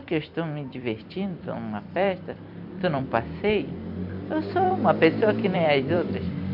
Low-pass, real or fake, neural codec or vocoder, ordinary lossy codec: 5.4 kHz; fake; vocoder, 44.1 kHz, 128 mel bands every 256 samples, BigVGAN v2; MP3, 48 kbps